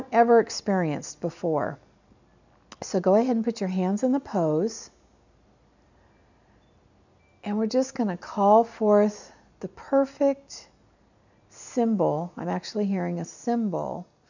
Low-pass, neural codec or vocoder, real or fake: 7.2 kHz; none; real